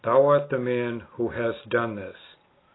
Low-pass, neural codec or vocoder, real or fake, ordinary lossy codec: 7.2 kHz; none; real; AAC, 16 kbps